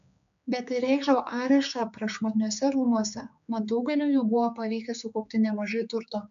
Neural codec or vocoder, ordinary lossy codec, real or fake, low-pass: codec, 16 kHz, 4 kbps, X-Codec, HuBERT features, trained on general audio; AAC, 64 kbps; fake; 7.2 kHz